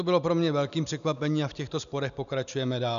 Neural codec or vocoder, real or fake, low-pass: none; real; 7.2 kHz